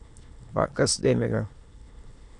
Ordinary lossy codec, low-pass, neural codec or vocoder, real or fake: AAC, 48 kbps; 9.9 kHz; autoencoder, 22.05 kHz, a latent of 192 numbers a frame, VITS, trained on many speakers; fake